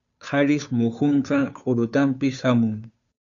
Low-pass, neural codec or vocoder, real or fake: 7.2 kHz; codec, 16 kHz, 2 kbps, FunCodec, trained on Chinese and English, 25 frames a second; fake